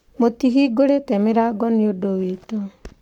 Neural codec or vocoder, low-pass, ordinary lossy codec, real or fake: codec, 44.1 kHz, 7.8 kbps, Pupu-Codec; 19.8 kHz; none; fake